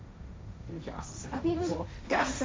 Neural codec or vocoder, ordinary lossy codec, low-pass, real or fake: codec, 16 kHz, 1.1 kbps, Voila-Tokenizer; none; none; fake